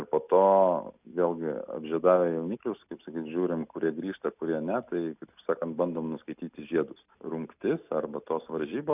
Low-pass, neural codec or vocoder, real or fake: 3.6 kHz; none; real